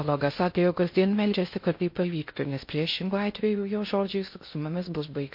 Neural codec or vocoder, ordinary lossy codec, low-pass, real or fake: codec, 16 kHz in and 24 kHz out, 0.6 kbps, FocalCodec, streaming, 2048 codes; MP3, 32 kbps; 5.4 kHz; fake